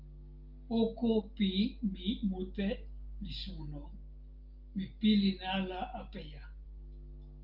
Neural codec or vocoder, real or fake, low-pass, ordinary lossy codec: none; real; 5.4 kHz; Opus, 32 kbps